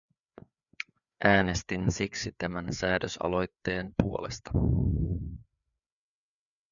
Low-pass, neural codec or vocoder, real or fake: 7.2 kHz; codec, 16 kHz, 4 kbps, FreqCodec, larger model; fake